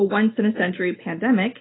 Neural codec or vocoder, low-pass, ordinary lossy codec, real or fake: none; 7.2 kHz; AAC, 16 kbps; real